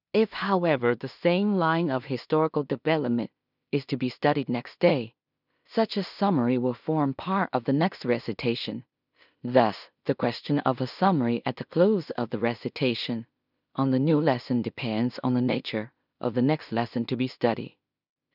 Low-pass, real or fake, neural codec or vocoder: 5.4 kHz; fake; codec, 16 kHz in and 24 kHz out, 0.4 kbps, LongCat-Audio-Codec, two codebook decoder